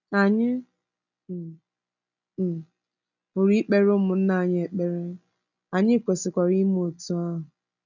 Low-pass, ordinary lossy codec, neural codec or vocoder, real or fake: 7.2 kHz; none; none; real